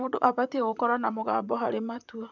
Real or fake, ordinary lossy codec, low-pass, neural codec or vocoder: fake; none; 7.2 kHz; vocoder, 44.1 kHz, 128 mel bands, Pupu-Vocoder